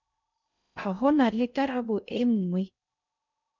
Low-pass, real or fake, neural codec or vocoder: 7.2 kHz; fake; codec, 16 kHz in and 24 kHz out, 0.6 kbps, FocalCodec, streaming, 2048 codes